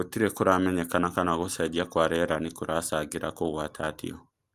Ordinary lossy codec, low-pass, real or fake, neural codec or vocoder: Opus, 32 kbps; 14.4 kHz; real; none